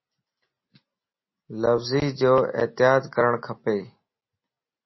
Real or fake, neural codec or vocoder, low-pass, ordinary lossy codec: real; none; 7.2 kHz; MP3, 24 kbps